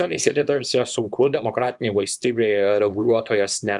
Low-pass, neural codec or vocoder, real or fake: 10.8 kHz; codec, 24 kHz, 0.9 kbps, WavTokenizer, small release; fake